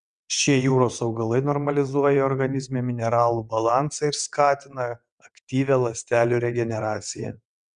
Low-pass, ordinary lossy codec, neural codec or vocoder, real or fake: 9.9 kHz; Opus, 64 kbps; vocoder, 22.05 kHz, 80 mel bands, WaveNeXt; fake